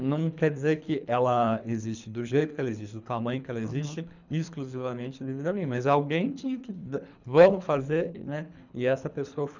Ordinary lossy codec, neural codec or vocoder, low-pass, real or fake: none; codec, 24 kHz, 3 kbps, HILCodec; 7.2 kHz; fake